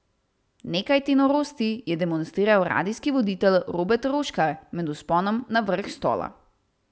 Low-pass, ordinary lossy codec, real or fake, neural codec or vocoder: none; none; real; none